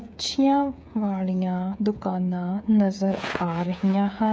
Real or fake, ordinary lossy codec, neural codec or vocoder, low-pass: fake; none; codec, 16 kHz, 8 kbps, FreqCodec, smaller model; none